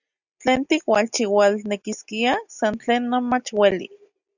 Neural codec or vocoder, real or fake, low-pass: none; real; 7.2 kHz